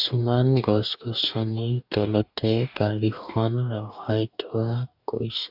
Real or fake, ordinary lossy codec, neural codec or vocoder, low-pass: fake; AAC, 48 kbps; codec, 44.1 kHz, 2.6 kbps, DAC; 5.4 kHz